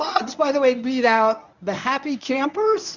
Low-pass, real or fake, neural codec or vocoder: 7.2 kHz; fake; codec, 24 kHz, 0.9 kbps, WavTokenizer, medium speech release version 1